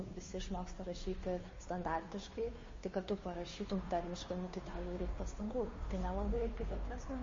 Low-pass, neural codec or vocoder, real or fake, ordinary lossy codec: 7.2 kHz; codec, 16 kHz, 2 kbps, FunCodec, trained on Chinese and English, 25 frames a second; fake; MP3, 32 kbps